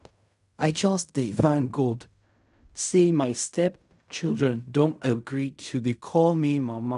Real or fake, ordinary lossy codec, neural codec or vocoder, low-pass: fake; none; codec, 16 kHz in and 24 kHz out, 0.4 kbps, LongCat-Audio-Codec, fine tuned four codebook decoder; 10.8 kHz